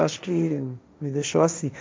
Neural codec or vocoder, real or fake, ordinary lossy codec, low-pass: codec, 16 kHz, 1.1 kbps, Voila-Tokenizer; fake; none; none